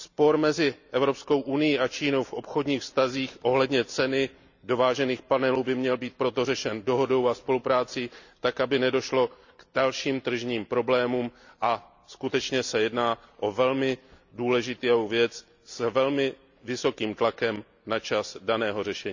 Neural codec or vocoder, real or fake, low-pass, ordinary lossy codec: none; real; 7.2 kHz; none